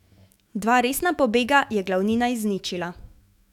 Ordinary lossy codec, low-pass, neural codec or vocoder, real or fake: none; 19.8 kHz; autoencoder, 48 kHz, 128 numbers a frame, DAC-VAE, trained on Japanese speech; fake